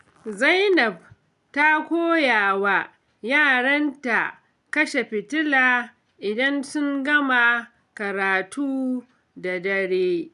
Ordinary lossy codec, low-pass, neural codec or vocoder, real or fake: none; 10.8 kHz; none; real